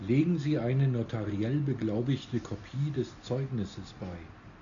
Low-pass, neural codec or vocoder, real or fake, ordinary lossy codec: 7.2 kHz; none; real; MP3, 96 kbps